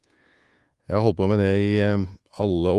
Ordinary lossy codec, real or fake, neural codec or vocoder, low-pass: Opus, 24 kbps; fake; codec, 24 kHz, 1.2 kbps, DualCodec; 10.8 kHz